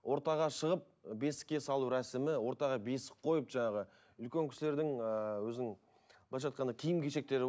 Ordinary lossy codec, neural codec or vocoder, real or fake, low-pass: none; none; real; none